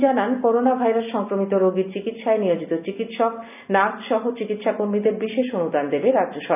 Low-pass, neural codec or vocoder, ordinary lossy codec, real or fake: 3.6 kHz; none; none; real